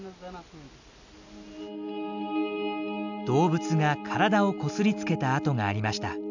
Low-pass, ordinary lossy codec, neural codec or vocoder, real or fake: 7.2 kHz; none; none; real